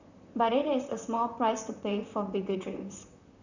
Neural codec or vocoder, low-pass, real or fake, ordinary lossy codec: vocoder, 44.1 kHz, 128 mel bands, Pupu-Vocoder; 7.2 kHz; fake; none